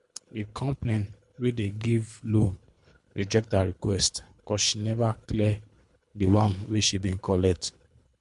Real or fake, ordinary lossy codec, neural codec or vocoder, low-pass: fake; MP3, 64 kbps; codec, 24 kHz, 3 kbps, HILCodec; 10.8 kHz